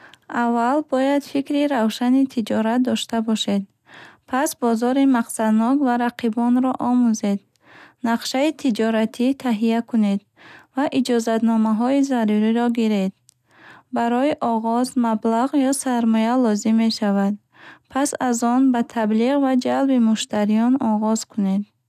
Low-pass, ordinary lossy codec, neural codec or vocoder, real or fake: 14.4 kHz; none; none; real